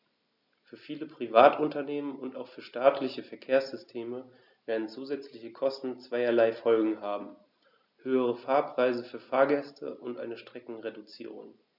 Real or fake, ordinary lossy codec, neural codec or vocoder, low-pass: real; none; none; 5.4 kHz